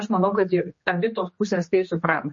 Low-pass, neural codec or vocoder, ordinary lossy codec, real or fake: 7.2 kHz; codec, 16 kHz, 2 kbps, X-Codec, HuBERT features, trained on general audio; MP3, 32 kbps; fake